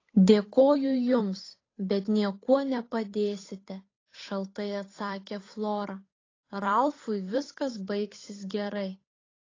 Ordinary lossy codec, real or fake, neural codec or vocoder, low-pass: AAC, 32 kbps; fake; codec, 16 kHz, 8 kbps, FunCodec, trained on Chinese and English, 25 frames a second; 7.2 kHz